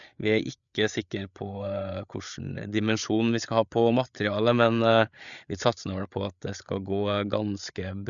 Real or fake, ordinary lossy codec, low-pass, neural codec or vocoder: fake; none; 7.2 kHz; codec, 16 kHz, 8 kbps, FreqCodec, larger model